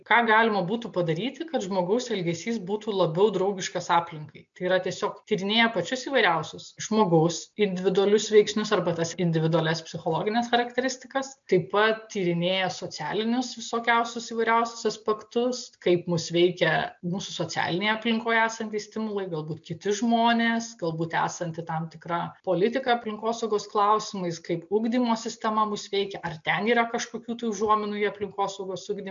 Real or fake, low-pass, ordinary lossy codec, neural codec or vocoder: real; 7.2 kHz; MP3, 64 kbps; none